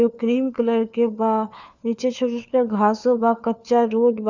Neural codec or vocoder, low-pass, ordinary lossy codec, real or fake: codec, 16 kHz, 4 kbps, FunCodec, trained on LibriTTS, 50 frames a second; 7.2 kHz; none; fake